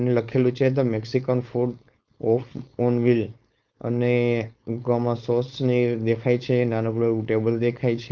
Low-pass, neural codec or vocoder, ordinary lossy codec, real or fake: 7.2 kHz; codec, 16 kHz, 4.8 kbps, FACodec; Opus, 32 kbps; fake